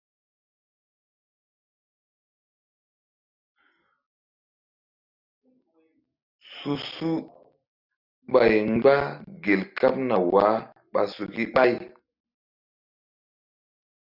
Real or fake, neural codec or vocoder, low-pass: real; none; 5.4 kHz